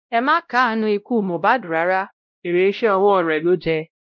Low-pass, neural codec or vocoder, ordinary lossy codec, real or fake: 7.2 kHz; codec, 16 kHz, 0.5 kbps, X-Codec, WavLM features, trained on Multilingual LibriSpeech; none; fake